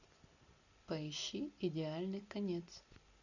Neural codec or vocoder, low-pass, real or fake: none; 7.2 kHz; real